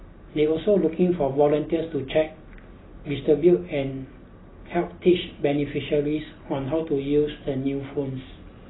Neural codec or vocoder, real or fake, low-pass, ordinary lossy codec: none; real; 7.2 kHz; AAC, 16 kbps